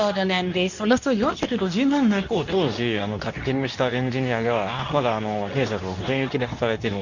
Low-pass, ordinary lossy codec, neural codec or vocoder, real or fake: 7.2 kHz; none; codec, 24 kHz, 0.9 kbps, WavTokenizer, medium speech release version 2; fake